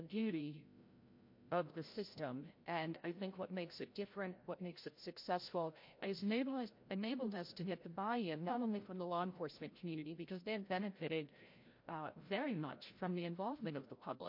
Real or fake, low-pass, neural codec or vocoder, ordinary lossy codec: fake; 5.4 kHz; codec, 16 kHz, 0.5 kbps, FreqCodec, larger model; MP3, 32 kbps